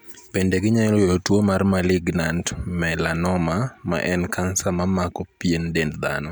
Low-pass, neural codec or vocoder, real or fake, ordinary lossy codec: none; none; real; none